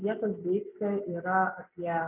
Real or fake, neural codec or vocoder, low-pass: real; none; 3.6 kHz